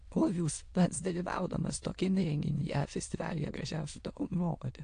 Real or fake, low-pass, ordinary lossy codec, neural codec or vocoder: fake; 9.9 kHz; AAC, 48 kbps; autoencoder, 22.05 kHz, a latent of 192 numbers a frame, VITS, trained on many speakers